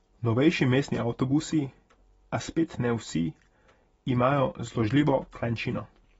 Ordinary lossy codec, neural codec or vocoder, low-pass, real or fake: AAC, 24 kbps; none; 9.9 kHz; real